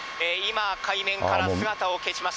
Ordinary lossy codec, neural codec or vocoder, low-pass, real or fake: none; none; none; real